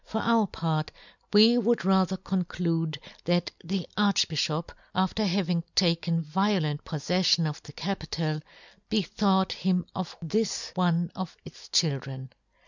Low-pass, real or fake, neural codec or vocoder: 7.2 kHz; real; none